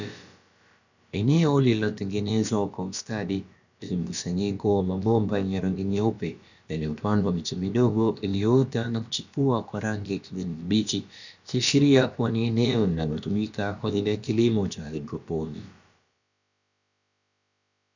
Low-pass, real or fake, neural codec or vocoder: 7.2 kHz; fake; codec, 16 kHz, about 1 kbps, DyCAST, with the encoder's durations